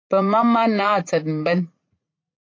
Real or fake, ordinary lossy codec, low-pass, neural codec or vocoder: fake; AAC, 32 kbps; 7.2 kHz; vocoder, 44.1 kHz, 80 mel bands, Vocos